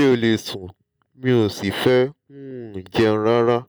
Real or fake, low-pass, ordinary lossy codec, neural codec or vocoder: real; none; none; none